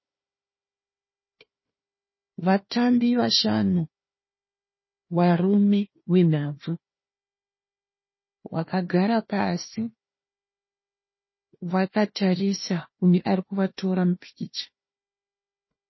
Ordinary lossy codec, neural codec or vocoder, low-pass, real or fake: MP3, 24 kbps; codec, 16 kHz, 1 kbps, FunCodec, trained on Chinese and English, 50 frames a second; 7.2 kHz; fake